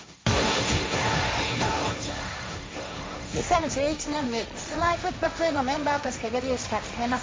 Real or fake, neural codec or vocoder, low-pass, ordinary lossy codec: fake; codec, 16 kHz, 1.1 kbps, Voila-Tokenizer; none; none